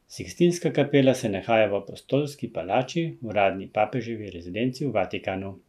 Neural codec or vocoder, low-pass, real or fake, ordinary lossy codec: none; 14.4 kHz; real; none